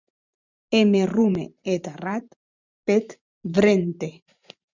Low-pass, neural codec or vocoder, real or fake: 7.2 kHz; vocoder, 44.1 kHz, 128 mel bands every 512 samples, BigVGAN v2; fake